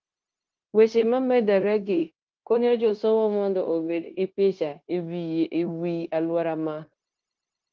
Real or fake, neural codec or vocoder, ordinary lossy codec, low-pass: fake; codec, 16 kHz, 0.9 kbps, LongCat-Audio-Codec; Opus, 32 kbps; 7.2 kHz